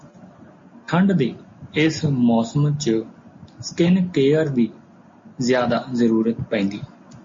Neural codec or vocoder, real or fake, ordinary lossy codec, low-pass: none; real; MP3, 32 kbps; 7.2 kHz